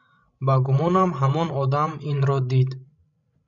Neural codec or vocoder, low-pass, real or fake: codec, 16 kHz, 16 kbps, FreqCodec, larger model; 7.2 kHz; fake